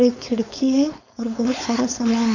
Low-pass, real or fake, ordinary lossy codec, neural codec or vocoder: 7.2 kHz; fake; none; codec, 16 kHz, 4.8 kbps, FACodec